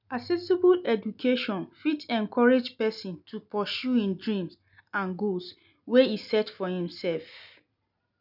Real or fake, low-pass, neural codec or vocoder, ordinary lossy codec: real; 5.4 kHz; none; none